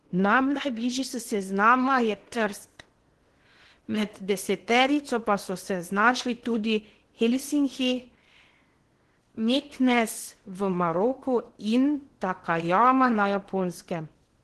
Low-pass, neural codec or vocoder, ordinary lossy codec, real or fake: 10.8 kHz; codec, 16 kHz in and 24 kHz out, 0.8 kbps, FocalCodec, streaming, 65536 codes; Opus, 16 kbps; fake